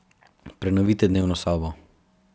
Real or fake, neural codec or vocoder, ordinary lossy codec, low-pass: real; none; none; none